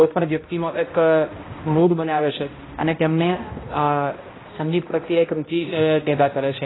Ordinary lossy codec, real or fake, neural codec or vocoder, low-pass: AAC, 16 kbps; fake; codec, 16 kHz, 0.5 kbps, X-Codec, HuBERT features, trained on balanced general audio; 7.2 kHz